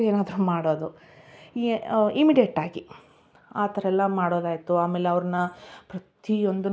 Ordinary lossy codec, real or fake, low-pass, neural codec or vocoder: none; real; none; none